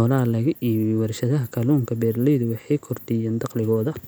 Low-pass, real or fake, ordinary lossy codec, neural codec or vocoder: none; real; none; none